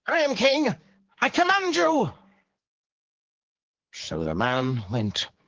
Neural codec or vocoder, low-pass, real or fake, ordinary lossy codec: codec, 16 kHz, 4 kbps, X-Codec, HuBERT features, trained on general audio; 7.2 kHz; fake; Opus, 16 kbps